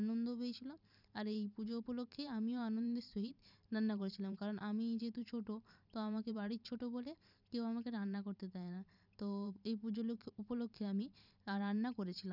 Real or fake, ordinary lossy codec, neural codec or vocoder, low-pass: fake; none; vocoder, 44.1 kHz, 128 mel bands every 512 samples, BigVGAN v2; 5.4 kHz